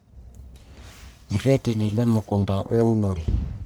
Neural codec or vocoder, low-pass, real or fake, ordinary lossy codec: codec, 44.1 kHz, 1.7 kbps, Pupu-Codec; none; fake; none